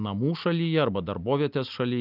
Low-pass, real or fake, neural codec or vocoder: 5.4 kHz; real; none